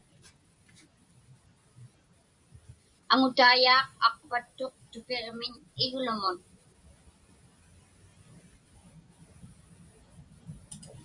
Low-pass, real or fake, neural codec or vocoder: 10.8 kHz; real; none